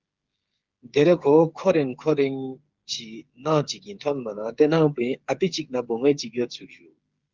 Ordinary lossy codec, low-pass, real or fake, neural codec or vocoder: Opus, 32 kbps; 7.2 kHz; fake; codec, 16 kHz, 8 kbps, FreqCodec, smaller model